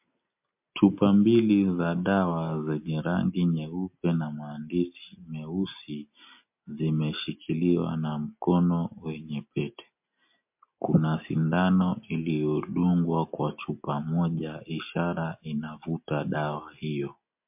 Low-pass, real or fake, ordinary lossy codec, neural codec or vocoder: 3.6 kHz; real; MP3, 32 kbps; none